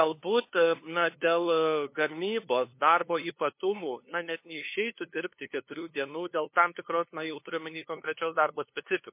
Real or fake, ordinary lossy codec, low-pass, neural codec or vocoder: fake; MP3, 24 kbps; 3.6 kHz; codec, 16 kHz, 2 kbps, FunCodec, trained on LibriTTS, 25 frames a second